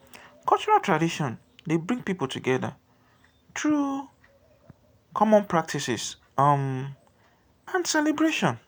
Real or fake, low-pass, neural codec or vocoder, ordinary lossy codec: real; none; none; none